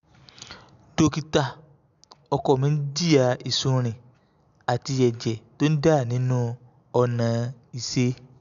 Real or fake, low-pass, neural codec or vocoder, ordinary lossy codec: real; 7.2 kHz; none; MP3, 96 kbps